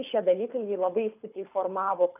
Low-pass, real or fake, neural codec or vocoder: 3.6 kHz; fake; codec, 24 kHz, 6 kbps, HILCodec